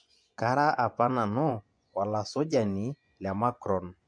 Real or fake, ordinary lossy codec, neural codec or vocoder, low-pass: fake; MP3, 64 kbps; vocoder, 24 kHz, 100 mel bands, Vocos; 9.9 kHz